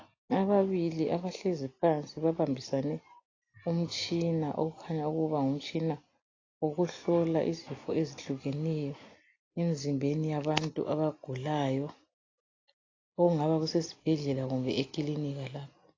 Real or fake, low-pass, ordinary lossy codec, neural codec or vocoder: real; 7.2 kHz; AAC, 32 kbps; none